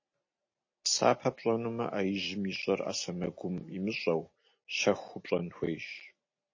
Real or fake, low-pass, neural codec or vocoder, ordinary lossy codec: real; 7.2 kHz; none; MP3, 32 kbps